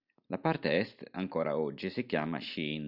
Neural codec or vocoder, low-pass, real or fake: none; 5.4 kHz; real